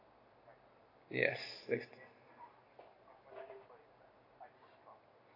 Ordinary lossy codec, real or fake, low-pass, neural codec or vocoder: AAC, 32 kbps; real; 5.4 kHz; none